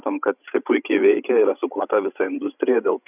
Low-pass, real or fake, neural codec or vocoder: 3.6 kHz; fake; codec, 16 kHz, 16 kbps, FreqCodec, larger model